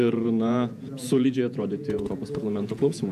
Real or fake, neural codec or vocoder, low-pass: fake; vocoder, 48 kHz, 128 mel bands, Vocos; 14.4 kHz